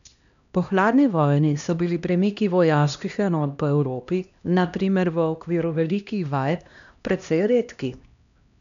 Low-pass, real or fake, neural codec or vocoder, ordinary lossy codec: 7.2 kHz; fake; codec, 16 kHz, 1 kbps, X-Codec, HuBERT features, trained on LibriSpeech; none